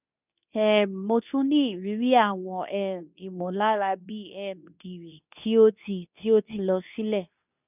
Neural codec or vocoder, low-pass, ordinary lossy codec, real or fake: codec, 24 kHz, 0.9 kbps, WavTokenizer, medium speech release version 2; 3.6 kHz; none; fake